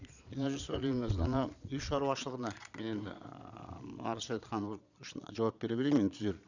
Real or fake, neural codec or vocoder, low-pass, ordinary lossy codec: fake; vocoder, 22.05 kHz, 80 mel bands, Vocos; 7.2 kHz; none